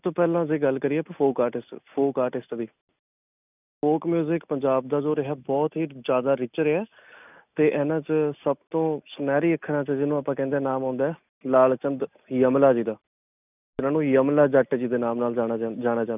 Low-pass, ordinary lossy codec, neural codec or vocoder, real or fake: 3.6 kHz; none; none; real